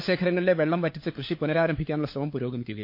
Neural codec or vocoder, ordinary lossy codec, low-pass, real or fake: codec, 16 kHz, 4 kbps, FunCodec, trained on LibriTTS, 50 frames a second; MP3, 32 kbps; 5.4 kHz; fake